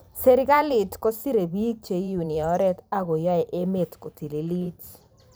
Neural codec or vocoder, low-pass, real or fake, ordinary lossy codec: vocoder, 44.1 kHz, 128 mel bands every 512 samples, BigVGAN v2; none; fake; none